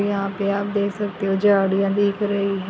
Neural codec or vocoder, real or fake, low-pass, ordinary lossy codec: none; real; none; none